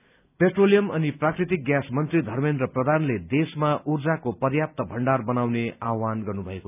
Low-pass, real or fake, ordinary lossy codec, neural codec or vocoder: 3.6 kHz; real; none; none